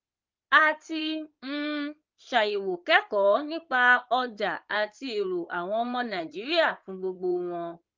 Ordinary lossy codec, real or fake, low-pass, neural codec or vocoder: Opus, 24 kbps; fake; 7.2 kHz; codec, 16 kHz in and 24 kHz out, 2.2 kbps, FireRedTTS-2 codec